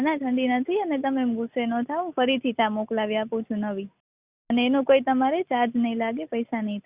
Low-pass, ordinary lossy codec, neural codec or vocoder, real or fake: 3.6 kHz; Opus, 64 kbps; none; real